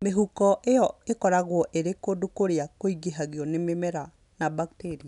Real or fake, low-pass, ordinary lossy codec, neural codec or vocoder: real; 10.8 kHz; none; none